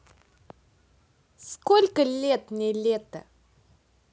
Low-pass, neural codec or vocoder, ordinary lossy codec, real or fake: none; none; none; real